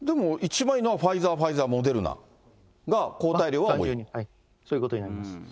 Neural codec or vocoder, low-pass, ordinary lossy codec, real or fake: none; none; none; real